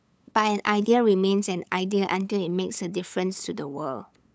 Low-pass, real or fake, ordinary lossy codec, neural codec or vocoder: none; fake; none; codec, 16 kHz, 8 kbps, FunCodec, trained on LibriTTS, 25 frames a second